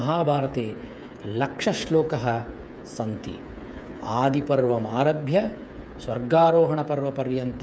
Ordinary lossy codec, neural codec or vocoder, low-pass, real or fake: none; codec, 16 kHz, 8 kbps, FreqCodec, smaller model; none; fake